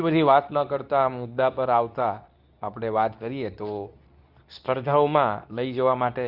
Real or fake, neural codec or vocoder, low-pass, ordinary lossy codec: fake; codec, 24 kHz, 0.9 kbps, WavTokenizer, medium speech release version 2; 5.4 kHz; none